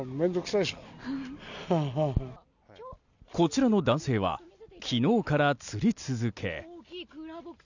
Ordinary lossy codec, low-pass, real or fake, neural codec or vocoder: none; 7.2 kHz; real; none